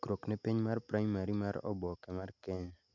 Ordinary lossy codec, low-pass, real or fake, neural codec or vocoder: none; 7.2 kHz; real; none